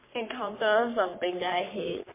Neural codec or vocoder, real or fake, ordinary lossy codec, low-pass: codec, 44.1 kHz, 3.4 kbps, Pupu-Codec; fake; MP3, 24 kbps; 3.6 kHz